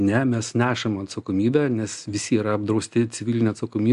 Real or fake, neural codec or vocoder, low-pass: real; none; 10.8 kHz